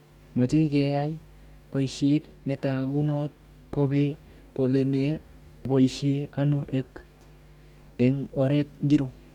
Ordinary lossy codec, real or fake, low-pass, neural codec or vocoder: none; fake; 19.8 kHz; codec, 44.1 kHz, 2.6 kbps, DAC